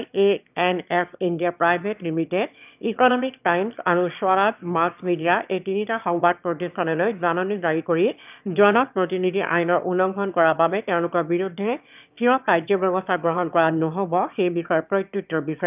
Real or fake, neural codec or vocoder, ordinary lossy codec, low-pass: fake; autoencoder, 22.05 kHz, a latent of 192 numbers a frame, VITS, trained on one speaker; none; 3.6 kHz